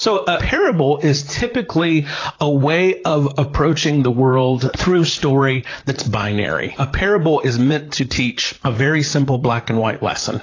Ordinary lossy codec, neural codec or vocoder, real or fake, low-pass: AAC, 32 kbps; vocoder, 44.1 kHz, 128 mel bands, Pupu-Vocoder; fake; 7.2 kHz